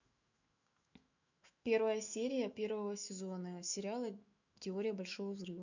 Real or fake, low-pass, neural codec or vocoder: fake; 7.2 kHz; autoencoder, 48 kHz, 128 numbers a frame, DAC-VAE, trained on Japanese speech